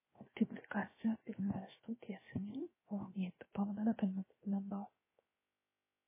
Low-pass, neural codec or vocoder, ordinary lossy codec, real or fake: 3.6 kHz; codec, 16 kHz, 0.7 kbps, FocalCodec; MP3, 16 kbps; fake